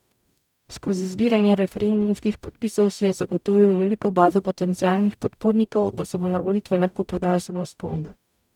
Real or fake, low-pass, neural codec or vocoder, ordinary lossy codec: fake; 19.8 kHz; codec, 44.1 kHz, 0.9 kbps, DAC; none